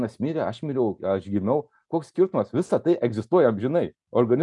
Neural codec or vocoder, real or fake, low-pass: none; real; 10.8 kHz